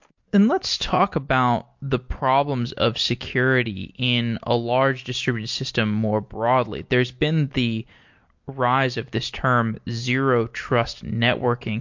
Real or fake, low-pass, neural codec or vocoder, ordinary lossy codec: real; 7.2 kHz; none; MP3, 48 kbps